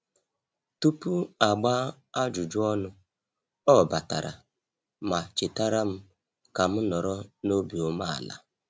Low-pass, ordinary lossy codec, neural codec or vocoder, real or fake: none; none; none; real